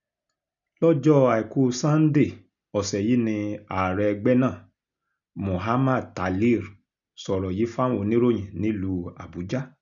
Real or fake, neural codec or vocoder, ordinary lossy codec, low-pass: real; none; none; 7.2 kHz